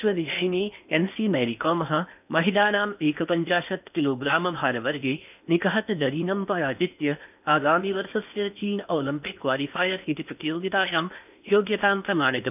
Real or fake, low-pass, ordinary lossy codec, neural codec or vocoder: fake; 3.6 kHz; none; codec, 16 kHz in and 24 kHz out, 0.8 kbps, FocalCodec, streaming, 65536 codes